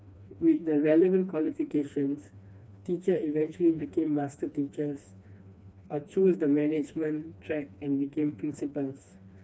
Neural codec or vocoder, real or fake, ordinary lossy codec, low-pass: codec, 16 kHz, 2 kbps, FreqCodec, smaller model; fake; none; none